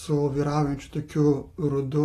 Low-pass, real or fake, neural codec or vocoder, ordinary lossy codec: 14.4 kHz; real; none; AAC, 48 kbps